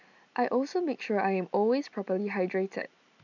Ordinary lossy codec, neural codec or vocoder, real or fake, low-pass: none; none; real; 7.2 kHz